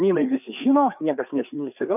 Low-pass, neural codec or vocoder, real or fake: 3.6 kHz; codec, 16 kHz, 4 kbps, FreqCodec, larger model; fake